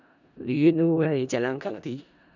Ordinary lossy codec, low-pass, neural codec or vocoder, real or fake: none; 7.2 kHz; codec, 16 kHz in and 24 kHz out, 0.4 kbps, LongCat-Audio-Codec, four codebook decoder; fake